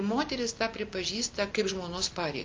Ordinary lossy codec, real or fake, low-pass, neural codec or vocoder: Opus, 24 kbps; real; 7.2 kHz; none